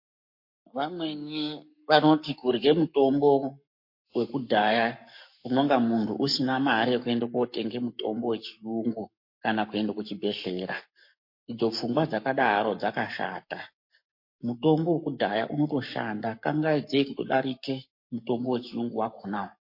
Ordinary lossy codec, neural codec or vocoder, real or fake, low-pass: MP3, 32 kbps; codec, 44.1 kHz, 7.8 kbps, Pupu-Codec; fake; 5.4 kHz